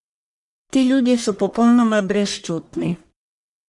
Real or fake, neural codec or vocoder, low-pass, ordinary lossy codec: fake; codec, 44.1 kHz, 1.7 kbps, Pupu-Codec; 10.8 kHz; none